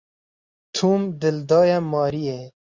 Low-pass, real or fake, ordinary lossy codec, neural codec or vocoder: 7.2 kHz; real; Opus, 64 kbps; none